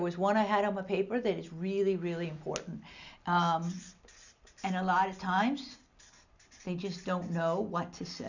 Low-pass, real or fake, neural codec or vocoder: 7.2 kHz; real; none